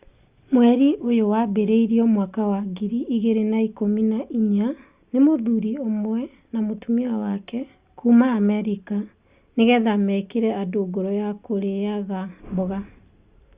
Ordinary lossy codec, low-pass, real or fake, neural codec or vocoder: Opus, 64 kbps; 3.6 kHz; real; none